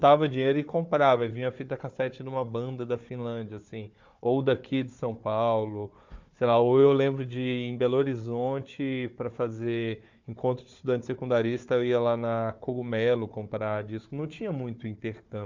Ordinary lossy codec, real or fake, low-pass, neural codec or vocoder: MP3, 64 kbps; fake; 7.2 kHz; codec, 16 kHz, 4 kbps, FunCodec, trained on Chinese and English, 50 frames a second